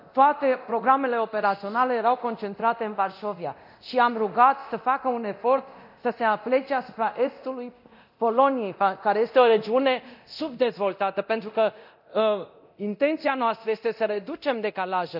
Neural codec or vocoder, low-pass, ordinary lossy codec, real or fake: codec, 24 kHz, 0.9 kbps, DualCodec; 5.4 kHz; none; fake